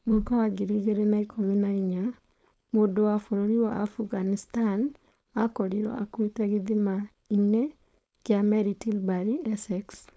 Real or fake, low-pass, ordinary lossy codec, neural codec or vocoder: fake; none; none; codec, 16 kHz, 4.8 kbps, FACodec